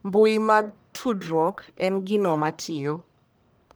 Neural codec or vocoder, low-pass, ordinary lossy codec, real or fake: codec, 44.1 kHz, 1.7 kbps, Pupu-Codec; none; none; fake